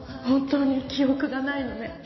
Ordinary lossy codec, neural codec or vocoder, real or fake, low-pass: MP3, 24 kbps; none; real; 7.2 kHz